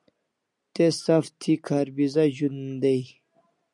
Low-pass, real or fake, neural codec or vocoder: 10.8 kHz; real; none